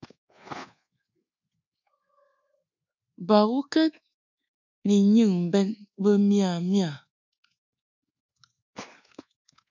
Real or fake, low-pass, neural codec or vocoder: fake; 7.2 kHz; codec, 24 kHz, 1.2 kbps, DualCodec